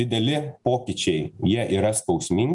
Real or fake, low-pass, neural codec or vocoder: real; 10.8 kHz; none